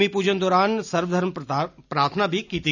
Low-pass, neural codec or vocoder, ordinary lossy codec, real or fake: 7.2 kHz; none; none; real